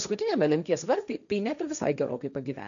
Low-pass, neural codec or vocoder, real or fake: 7.2 kHz; codec, 16 kHz, 1.1 kbps, Voila-Tokenizer; fake